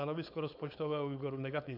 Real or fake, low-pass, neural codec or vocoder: fake; 5.4 kHz; codec, 16 kHz, 4.8 kbps, FACodec